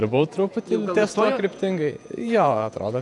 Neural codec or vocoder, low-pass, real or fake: vocoder, 48 kHz, 128 mel bands, Vocos; 10.8 kHz; fake